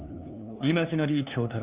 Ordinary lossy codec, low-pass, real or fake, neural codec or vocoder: Opus, 64 kbps; 3.6 kHz; fake; codec, 16 kHz, 2 kbps, FunCodec, trained on LibriTTS, 25 frames a second